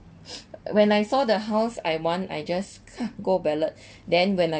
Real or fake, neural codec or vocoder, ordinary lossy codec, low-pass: real; none; none; none